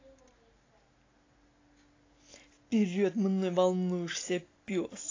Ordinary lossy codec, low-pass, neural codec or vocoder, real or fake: AAC, 32 kbps; 7.2 kHz; none; real